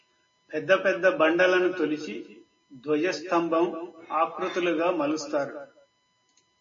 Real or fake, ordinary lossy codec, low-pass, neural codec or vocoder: real; MP3, 32 kbps; 7.2 kHz; none